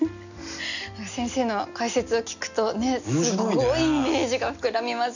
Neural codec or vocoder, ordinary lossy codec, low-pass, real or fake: none; none; 7.2 kHz; real